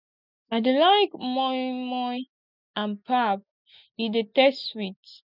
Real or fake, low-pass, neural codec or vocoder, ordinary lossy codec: real; 5.4 kHz; none; none